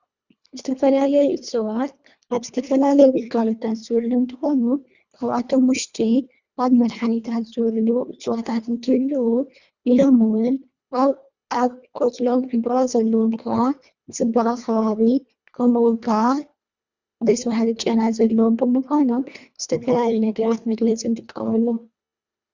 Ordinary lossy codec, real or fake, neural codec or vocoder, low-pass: Opus, 64 kbps; fake; codec, 24 kHz, 1.5 kbps, HILCodec; 7.2 kHz